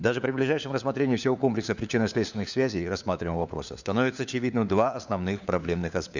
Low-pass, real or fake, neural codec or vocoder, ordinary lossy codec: 7.2 kHz; fake; codec, 16 kHz, 4 kbps, FunCodec, trained on LibriTTS, 50 frames a second; none